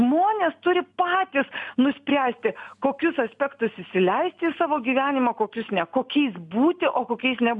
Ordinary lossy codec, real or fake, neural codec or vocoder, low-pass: MP3, 64 kbps; real; none; 10.8 kHz